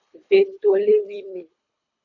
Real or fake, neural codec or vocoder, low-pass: fake; codec, 24 kHz, 3 kbps, HILCodec; 7.2 kHz